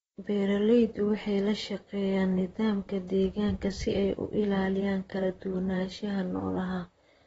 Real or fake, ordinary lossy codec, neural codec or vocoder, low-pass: fake; AAC, 24 kbps; vocoder, 44.1 kHz, 128 mel bands, Pupu-Vocoder; 19.8 kHz